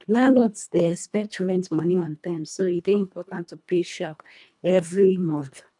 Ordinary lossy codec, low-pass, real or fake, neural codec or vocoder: none; 10.8 kHz; fake; codec, 24 kHz, 1.5 kbps, HILCodec